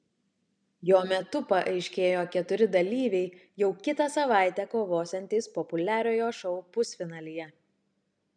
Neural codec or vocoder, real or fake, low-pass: none; real; 9.9 kHz